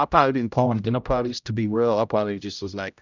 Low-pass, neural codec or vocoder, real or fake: 7.2 kHz; codec, 16 kHz, 0.5 kbps, X-Codec, HuBERT features, trained on general audio; fake